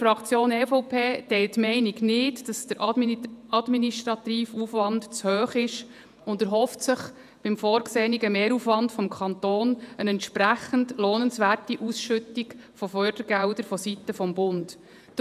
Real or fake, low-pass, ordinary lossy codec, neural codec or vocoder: fake; 14.4 kHz; none; vocoder, 48 kHz, 128 mel bands, Vocos